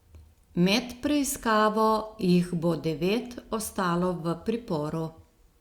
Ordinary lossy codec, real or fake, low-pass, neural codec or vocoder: Opus, 64 kbps; real; 19.8 kHz; none